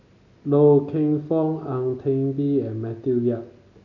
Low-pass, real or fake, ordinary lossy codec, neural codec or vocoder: 7.2 kHz; real; none; none